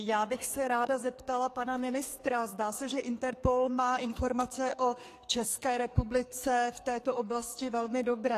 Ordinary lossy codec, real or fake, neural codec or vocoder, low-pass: AAC, 48 kbps; fake; codec, 44.1 kHz, 2.6 kbps, SNAC; 14.4 kHz